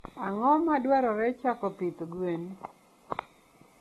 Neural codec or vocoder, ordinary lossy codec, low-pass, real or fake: none; AAC, 32 kbps; 19.8 kHz; real